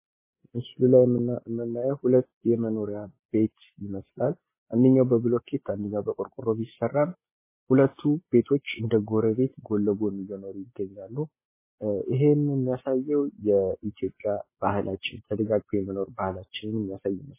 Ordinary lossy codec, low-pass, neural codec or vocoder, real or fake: MP3, 16 kbps; 3.6 kHz; none; real